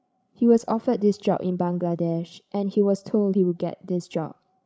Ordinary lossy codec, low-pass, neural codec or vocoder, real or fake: none; none; codec, 16 kHz, 16 kbps, FreqCodec, larger model; fake